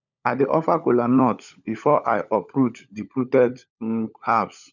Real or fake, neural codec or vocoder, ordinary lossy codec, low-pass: fake; codec, 16 kHz, 16 kbps, FunCodec, trained on LibriTTS, 50 frames a second; none; 7.2 kHz